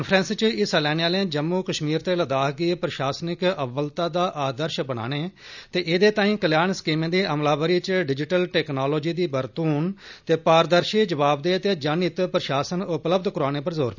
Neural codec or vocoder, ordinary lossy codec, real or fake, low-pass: none; none; real; 7.2 kHz